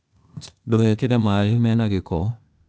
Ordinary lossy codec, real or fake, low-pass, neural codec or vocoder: none; fake; none; codec, 16 kHz, 0.8 kbps, ZipCodec